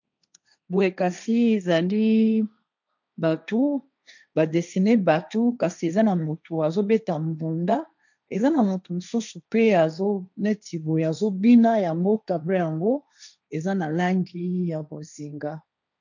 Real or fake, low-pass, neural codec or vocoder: fake; 7.2 kHz; codec, 16 kHz, 1.1 kbps, Voila-Tokenizer